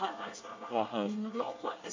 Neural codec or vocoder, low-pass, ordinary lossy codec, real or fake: codec, 24 kHz, 1 kbps, SNAC; 7.2 kHz; MP3, 64 kbps; fake